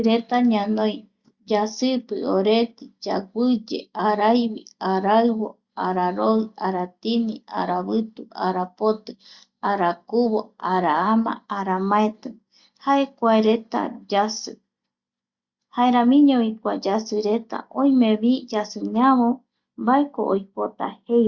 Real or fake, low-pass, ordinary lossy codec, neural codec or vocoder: real; 7.2 kHz; Opus, 64 kbps; none